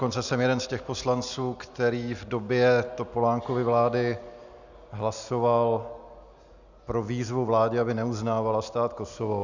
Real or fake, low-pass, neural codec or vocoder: real; 7.2 kHz; none